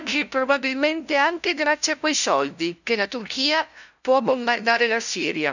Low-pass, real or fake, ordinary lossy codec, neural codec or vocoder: 7.2 kHz; fake; none; codec, 16 kHz, 0.5 kbps, FunCodec, trained on LibriTTS, 25 frames a second